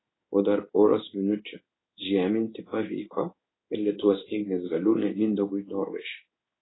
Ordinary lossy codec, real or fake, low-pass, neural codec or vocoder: AAC, 16 kbps; fake; 7.2 kHz; codec, 16 kHz in and 24 kHz out, 1 kbps, XY-Tokenizer